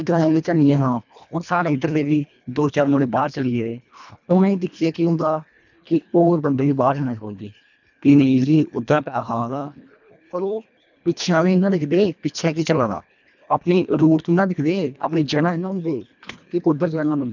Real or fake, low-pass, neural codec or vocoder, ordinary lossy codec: fake; 7.2 kHz; codec, 24 kHz, 1.5 kbps, HILCodec; none